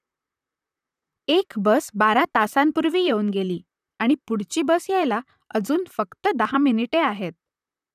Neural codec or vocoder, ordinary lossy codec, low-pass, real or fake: vocoder, 44.1 kHz, 128 mel bands, Pupu-Vocoder; none; 14.4 kHz; fake